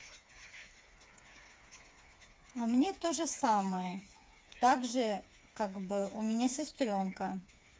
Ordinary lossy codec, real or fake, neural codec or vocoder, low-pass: none; fake; codec, 16 kHz, 4 kbps, FreqCodec, smaller model; none